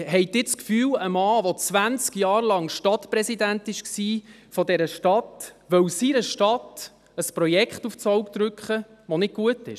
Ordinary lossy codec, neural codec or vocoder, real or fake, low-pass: none; none; real; 14.4 kHz